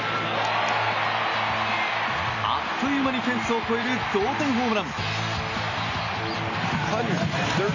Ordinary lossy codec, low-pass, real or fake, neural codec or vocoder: none; 7.2 kHz; real; none